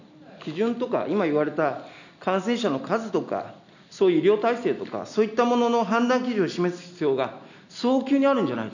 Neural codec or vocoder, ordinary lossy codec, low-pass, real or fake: none; none; 7.2 kHz; real